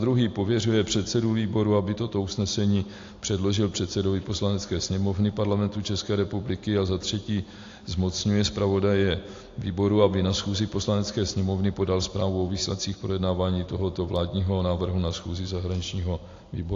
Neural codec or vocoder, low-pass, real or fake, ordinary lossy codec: none; 7.2 kHz; real; AAC, 48 kbps